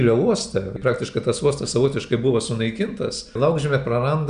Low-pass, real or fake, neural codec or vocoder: 9.9 kHz; real; none